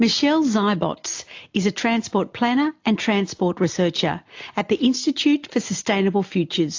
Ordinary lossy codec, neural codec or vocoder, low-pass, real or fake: AAC, 48 kbps; none; 7.2 kHz; real